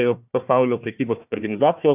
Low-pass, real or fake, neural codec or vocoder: 3.6 kHz; fake; codec, 16 kHz, 1 kbps, FunCodec, trained on Chinese and English, 50 frames a second